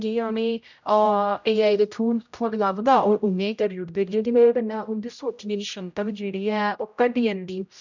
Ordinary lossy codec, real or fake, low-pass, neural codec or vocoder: none; fake; 7.2 kHz; codec, 16 kHz, 0.5 kbps, X-Codec, HuBERT features, trained on general audio